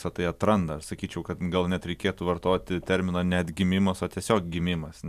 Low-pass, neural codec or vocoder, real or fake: 14.4 kHz; none; real